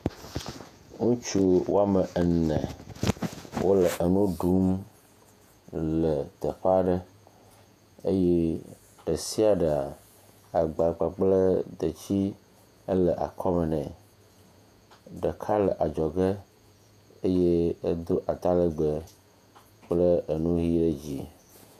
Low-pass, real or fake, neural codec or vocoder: 14.4 kHz; fake; vocoder, 48 kHz, 128 mel bands, Vocos